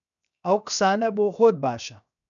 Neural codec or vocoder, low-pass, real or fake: codec, 16 kHz, 0.7 kbps, FocalCodec; 7.2 kHz; fake